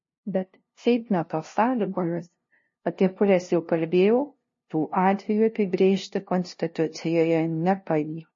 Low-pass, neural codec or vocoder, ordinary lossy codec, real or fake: 7.2 kHz; codec, 16 kHz, 0.5 kbps, FunCodec, trained on LibriTTS, 25 frames a second; MP3, 32 kbps; fake